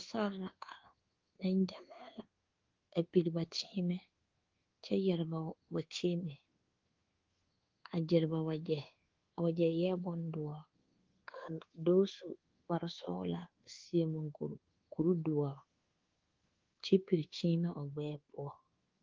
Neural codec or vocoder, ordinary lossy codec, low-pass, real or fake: codec, 24 kHz, 1.2 kbps, DualCodec; Opus, 16 kbps; 7.2 kHz; fake